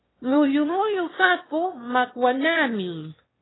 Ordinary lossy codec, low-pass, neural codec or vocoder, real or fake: AAC, 16 kbps; 7.2 kHz; autoencoder, 22.05 kHz, a latent of 192 numbers a frame, VITS, trained on one speaker; fake